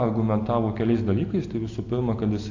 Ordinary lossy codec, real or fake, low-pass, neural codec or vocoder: AAC, 48 kbps; real; 7.2 kHz; none